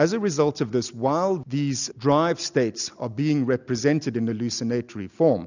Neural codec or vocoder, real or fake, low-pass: none; real; 7.2 kHz